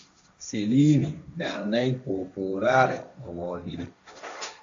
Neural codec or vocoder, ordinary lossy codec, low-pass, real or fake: codec, 16 kHz, 1.1 kbps, Voila-Tokenizer; AAC, 64 kbps; 7.2 kHz; fake